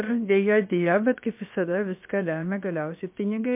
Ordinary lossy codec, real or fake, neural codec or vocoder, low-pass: MP3, 32 kbps; fake; codec, 16 kHz, about 1 kbps, DyCAST, with the encoder's durations; 3.6 kHz